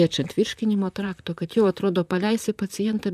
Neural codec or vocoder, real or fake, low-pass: vocoder, 44.1 kHz, 128 mel bands, Pupu-Vocoder; fake; 14.4 kHz